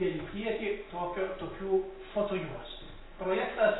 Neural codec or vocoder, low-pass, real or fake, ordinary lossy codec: none; 7.2 kHz; real; AAC, 16 kbps